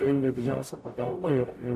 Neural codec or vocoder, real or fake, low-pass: codec, 44.1 kHz, 0.9 kbps, DAC; fake; 14.4 kHz